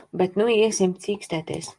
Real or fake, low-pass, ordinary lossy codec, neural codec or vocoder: real; 10.8 kHz; Opus, 32 kbps; none